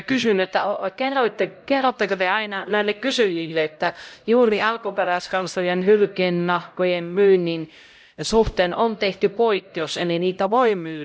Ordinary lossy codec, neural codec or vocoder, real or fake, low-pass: none; codec, 16 kHz, 0.5 kbps, X-Codec, HuBERT features, trained on LibriSpeech; fake; none